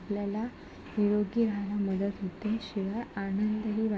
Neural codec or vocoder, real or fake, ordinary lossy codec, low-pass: none; real; none; none